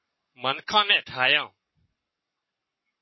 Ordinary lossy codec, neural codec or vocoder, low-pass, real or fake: MP3, 24 kbps; codec, 44.1 kHz, 7.8 kbps, Pupu-Codec; 7.2 kHz; fake